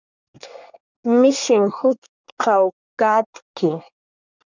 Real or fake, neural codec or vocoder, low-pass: fake; codec, 24 kHz, 1 kbps, SNAC; 7.2 kHz